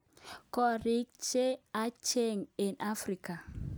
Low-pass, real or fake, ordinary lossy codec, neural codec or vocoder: none; real; none; none